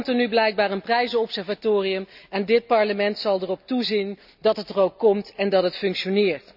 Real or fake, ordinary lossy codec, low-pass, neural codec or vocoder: real; none; 5.4 kHz; none